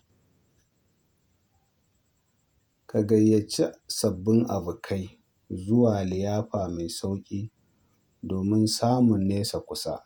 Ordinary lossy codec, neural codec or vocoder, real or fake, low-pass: none; vocoder, 48 kHz, 128 mel bands, Vocos; fake; none